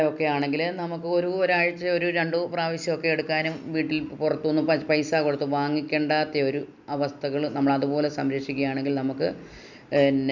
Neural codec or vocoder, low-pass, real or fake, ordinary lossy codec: none; 7.2 kHz; real; none